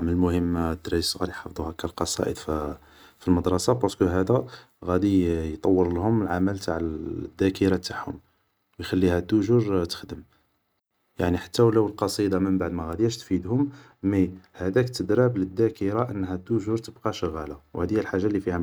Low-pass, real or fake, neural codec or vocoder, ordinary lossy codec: none; real; none; none